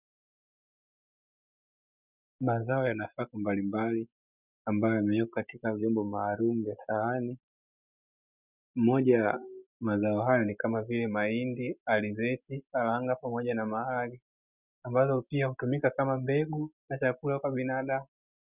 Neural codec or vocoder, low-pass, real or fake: none; 3.6 kHz; real